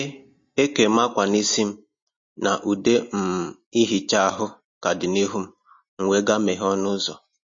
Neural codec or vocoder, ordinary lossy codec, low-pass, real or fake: none; MP3, 32 kbps; 7.2 kHz; real